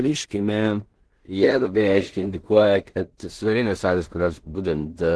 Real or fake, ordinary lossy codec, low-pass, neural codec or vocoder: fake; Opus, 16 kbps; 10.8 kHz; codec, 16 kHz in and 24 kHz out, 0.4 kbps, LongCat-Audio-Codec, two codebook decoder